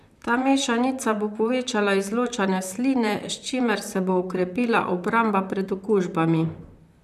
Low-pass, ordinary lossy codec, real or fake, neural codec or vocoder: 14.4 kHz; none; fake; vocoder, 44.1 kHz, 128 mel bands, Pupu-Vocoder